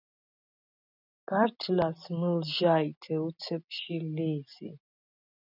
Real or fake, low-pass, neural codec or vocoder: real; 5.4 kHz; none